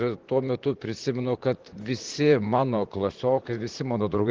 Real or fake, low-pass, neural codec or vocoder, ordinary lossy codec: fake; 7.2 kHz; vocoder, 44.1 kHz, 128 mel bands, Pupu-Vocoder; Opus, 16 kbps